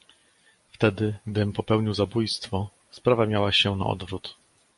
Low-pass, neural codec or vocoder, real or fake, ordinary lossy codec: 14.4 kHz; none; real; MP3, 48 kbps